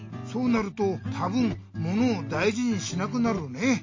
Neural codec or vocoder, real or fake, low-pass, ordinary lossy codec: none; real; 7.2 kHz; MP3, 32 kbps